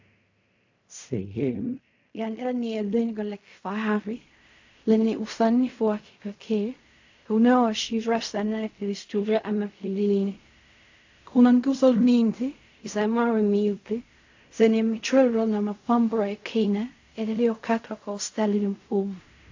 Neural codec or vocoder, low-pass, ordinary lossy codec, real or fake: codec, 16 kHz in and 24 kHz out, 0.4 kbps, LongCat-Audio-Codec, fine tuned four codebook decoder; 7.2 kHz; AAC, 48 kbps; fake